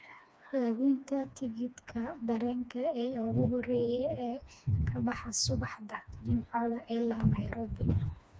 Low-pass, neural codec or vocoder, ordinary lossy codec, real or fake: none; codec, 16 kHz, 2 kbps, FreqCodec, smaller model; none; fake